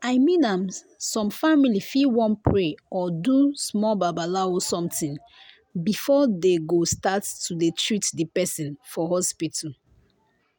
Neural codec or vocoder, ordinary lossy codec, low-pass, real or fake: none; none; none; real